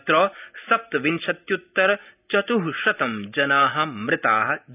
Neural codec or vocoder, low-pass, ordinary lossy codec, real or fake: none; 3.6 kHz; none; real